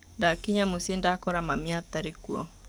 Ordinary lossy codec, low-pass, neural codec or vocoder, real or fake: none; none; codec, 44.1 kHz, 7.8 kbps, DAC; fake